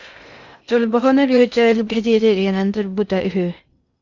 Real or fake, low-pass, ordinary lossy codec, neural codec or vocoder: fake; 7.2 kHz; Opus, 64 kbps; codec, 16 kHz in and 24 kHz out, 0.6 kbps, FocalCodec, streaming, 2048 codes